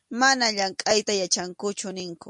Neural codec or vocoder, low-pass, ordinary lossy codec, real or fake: none; 10.8 kHz; MP3, 64 kbps; real